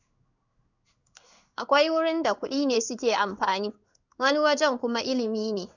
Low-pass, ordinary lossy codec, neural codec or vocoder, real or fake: 7.2 kHz; none; codec, 16 kHz in and 24 kHz out, 1 kbps, XY-Tokenizer; fake